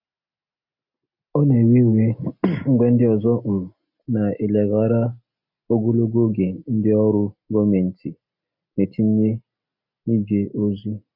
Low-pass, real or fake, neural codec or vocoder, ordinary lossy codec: 5.4 kHz; real; none; none